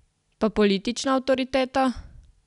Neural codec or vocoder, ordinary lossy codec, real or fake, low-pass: none; none; real; 10.8 kHz